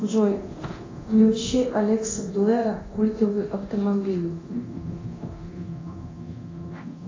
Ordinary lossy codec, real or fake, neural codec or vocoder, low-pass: AAC, 32 kbps; fake; codec, 24 kHz, 0.9 kbps, DualCodec; 7.2 kHz